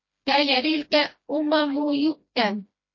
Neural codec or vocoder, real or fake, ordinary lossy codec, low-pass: codec, 16 kHz, 1 kbps, FreqCodec, smaller model; fake; MP3, 32 kbps; 7.2 kHz